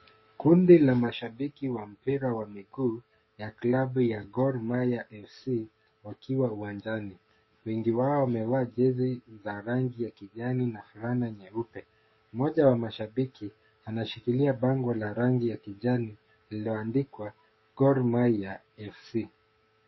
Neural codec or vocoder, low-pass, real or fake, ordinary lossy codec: codec, 44.1 kHz, 7.8 kbps, DAC; 7.2 kHz; fake; MP3, 24 kbps